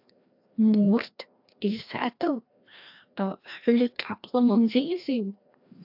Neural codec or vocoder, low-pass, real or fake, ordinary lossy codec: codec, 16 kHz, 1 kbps, FreqCodec, larger model; 5.4 kHz; fake; AAC, 48 kbps